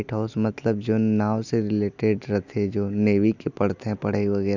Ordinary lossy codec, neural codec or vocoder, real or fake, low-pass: none; none; real; 7.2 kHz